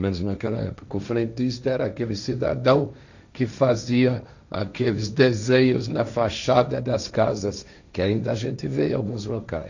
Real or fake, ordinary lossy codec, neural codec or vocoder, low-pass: fake; none; codec, 16 kHz, 1.1 kbps, Voila-Tokenizer; 7.2 kHz